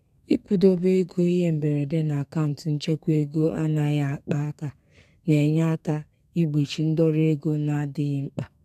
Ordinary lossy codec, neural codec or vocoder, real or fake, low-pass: none; codec, 32 kHz, 1.9 kbps, SNAC; fake; 14.4 kHz